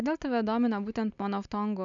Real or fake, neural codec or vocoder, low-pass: real; none; 7.2 kHz